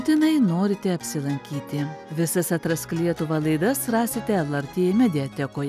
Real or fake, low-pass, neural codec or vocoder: real; 14.4 kHz; none